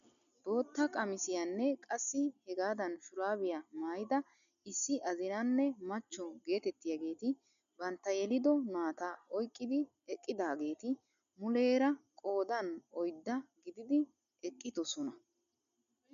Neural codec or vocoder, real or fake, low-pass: none; real; 7.2 kHz